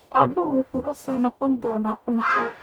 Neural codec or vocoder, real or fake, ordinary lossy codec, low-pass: codec, 44.1 kHz, 0.9 kbps, DAC; fake; none; none